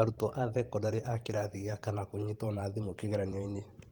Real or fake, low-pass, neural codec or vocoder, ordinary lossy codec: fake; 19.8 kHz; vocoder, 44.1 kHz, 128 mel bands every 512 samples, BigVGAN v2; Opus, 24 kbps